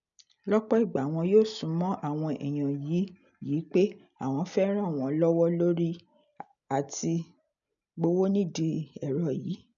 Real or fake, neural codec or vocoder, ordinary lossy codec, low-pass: real; none; MP3, 96 kbps; 7.2 kHz